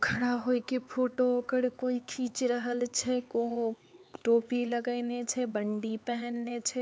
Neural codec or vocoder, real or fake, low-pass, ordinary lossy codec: codec, 16 kHz, 4 kbps, X-Codec, HuBERT features, trained on LibriSpeech; fake; none; none